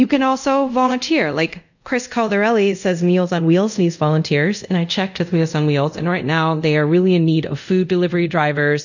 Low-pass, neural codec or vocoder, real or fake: 7.2 kHz; codec, 24 kHz, 0.5 kbps, DualCodec; fake